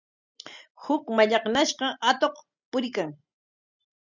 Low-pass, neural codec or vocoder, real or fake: 7.2 kHz; none; real